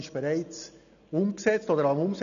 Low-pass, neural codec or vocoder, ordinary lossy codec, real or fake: 7.2 kHz; none; none; real